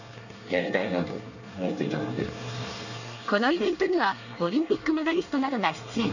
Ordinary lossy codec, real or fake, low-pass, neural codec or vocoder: none; fake; 7.2 kHz; codec, 24 kHz, 1 kbps, SNAC